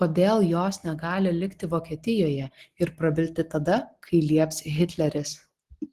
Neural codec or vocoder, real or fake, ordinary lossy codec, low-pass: none; real; Opus, 16 kbps; 14.4 kHz